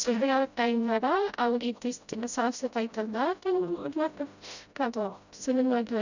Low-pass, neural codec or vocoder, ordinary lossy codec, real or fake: 7.2 kHz; codec, 16 kHz, 0.5 kbps, FreqCodec, smaller model; none; fake